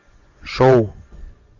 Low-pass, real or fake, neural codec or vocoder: 7.2 kHz; fake; vocoder, 24 kHz, 100 mel bands, Vocos